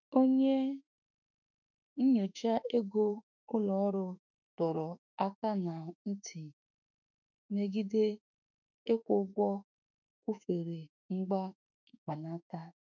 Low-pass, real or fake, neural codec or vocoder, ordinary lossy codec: 7.2 kHz; fake; autoencoder, 48 kHz, 32 numbers a frame, DAC-VAE, trained on Japanese speech; none